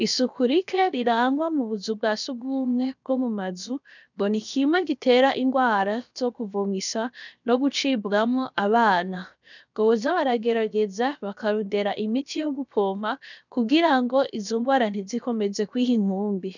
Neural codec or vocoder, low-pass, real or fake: codec, 16 kHz, 0.7 kbps, FocalCodec; 7.2 kHz; fake